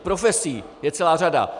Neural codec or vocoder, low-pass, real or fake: none; 10.8 kHz; real